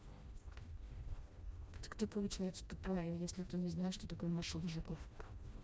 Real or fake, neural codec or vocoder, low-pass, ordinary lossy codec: fake; codec, 16 kHz, 1 kbps, FreqCodec, smaller model; none; none